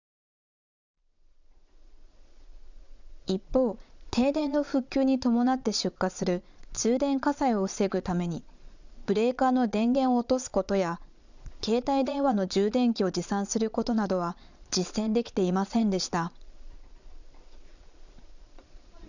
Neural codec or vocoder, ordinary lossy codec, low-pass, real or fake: vocoder, 22.05 kHz, 80 mel bands, Vocos; none; 7.2 kHz; fake